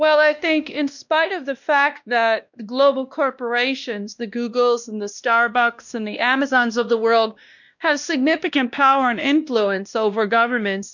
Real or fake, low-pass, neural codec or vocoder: fake; 7.2 kHz; codec, 16 kHz, 1 kbps, X-Codec, WavLM features, trained on Multilingual LibriSpeech